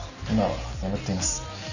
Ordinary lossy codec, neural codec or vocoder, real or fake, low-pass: none; none; real; 7.2 kHz